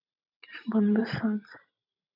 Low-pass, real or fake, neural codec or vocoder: 5.4 kHz; fake; vocoder, 44.1 kHz, 128 mel bands every 512 samples, BigVGAN v2